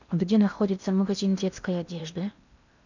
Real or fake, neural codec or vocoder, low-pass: fake; codec, 16 kHz in and 24 kHz out, 0.8 kbps, FocalCodec, streaming, 65536 codes; 7.2 kHz